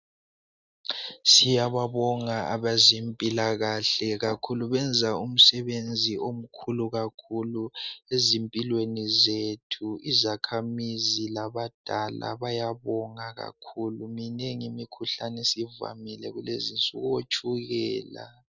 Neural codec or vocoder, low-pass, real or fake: none; 7.2 kHz; real